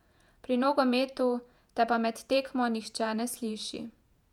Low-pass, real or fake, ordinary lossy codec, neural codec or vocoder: 19.8 kHz; fake; none; vocoder, 48 kHz, 128 mel bands, Vocos